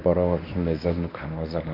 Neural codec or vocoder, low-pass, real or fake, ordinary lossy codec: codec, 24 kHz, 0.9 kbps, WavTokenizer, medium speech release version 1; 5.4 kHz; fake; none